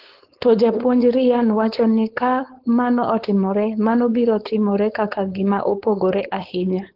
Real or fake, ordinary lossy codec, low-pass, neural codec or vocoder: fake; Opus, 16 kbps; 5.4 kHz; codec, 16 kHz, 4.8 kbps, FACodec